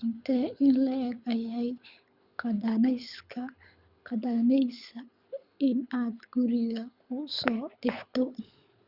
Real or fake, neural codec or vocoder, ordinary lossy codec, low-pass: fake; codec, 24 kHz, 6 kbps, HILCodec; Opus, 64 kbps; 5.4 kHz